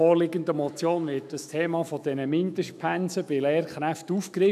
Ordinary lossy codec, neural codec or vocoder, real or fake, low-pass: none; autoencoder, 48 kHz, 128 numbers a frame, DAC-VAE, trained on Japanese speech; fake; 14.4 kHz